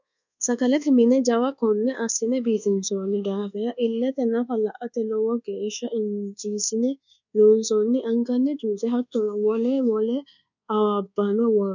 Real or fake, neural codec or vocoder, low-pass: fake; codec, 24 kHz, 1.2 kbps, DualCodec; 7.2 kHz